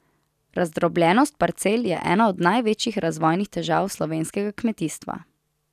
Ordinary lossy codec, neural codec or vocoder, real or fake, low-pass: none; none; real; 14.4 kHz